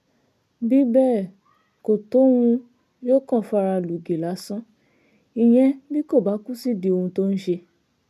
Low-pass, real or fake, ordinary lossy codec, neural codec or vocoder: 14.4 kHz; real; none; none